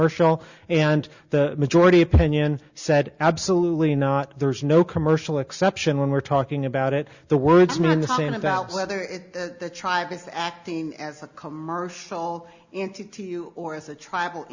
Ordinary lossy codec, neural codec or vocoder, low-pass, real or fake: Opus, 64 kbps; none; 7.2 kHz; real